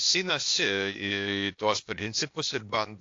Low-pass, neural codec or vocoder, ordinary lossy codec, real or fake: 7.2 kHz; codec, 16 kHz, 0.8 kbps, ZipCodec; AAC, 48 kbps; fake